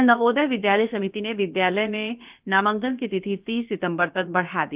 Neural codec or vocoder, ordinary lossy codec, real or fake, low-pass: codec, 16 kHz, about 1 kbps, DyCAST, with the encoder's durations; Opus, 24 kbps; fake; 3.6 kHz